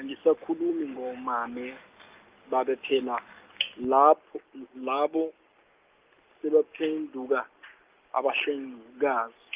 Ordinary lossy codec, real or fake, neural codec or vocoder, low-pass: Opus, 24 kbps; real; none; 3.6 kHz